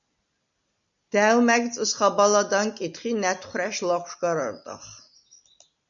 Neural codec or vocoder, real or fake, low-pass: none; real; 7.2 kHz